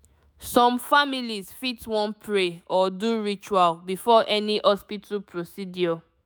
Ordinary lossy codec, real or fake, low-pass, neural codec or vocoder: none; fake; none; autoencoder, 48 kHz, 128 numbers a frame, DAC-VAE, trained on Japanese speech